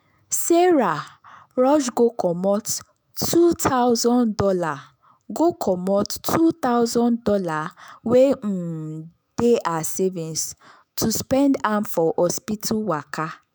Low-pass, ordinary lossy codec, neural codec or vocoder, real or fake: none; none; autoencoder, 48 kHz, 128 numbers a frame, DAC-VAE, trained on Japanese speech; fake